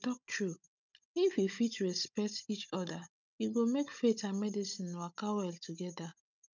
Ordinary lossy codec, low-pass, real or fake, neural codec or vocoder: none; 7.2 kHz; fake; codec, 16 kHz, 16 kbps, FunCodec, trained on Chinese and English, 50 frames a second